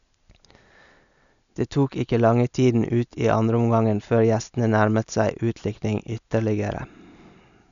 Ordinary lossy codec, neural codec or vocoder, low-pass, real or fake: none; none; 7.2 kHz; real